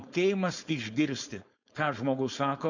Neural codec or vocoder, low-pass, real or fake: codec, 16 kHz, 4.8 kbps, FACodec; 7.2 kHz; fake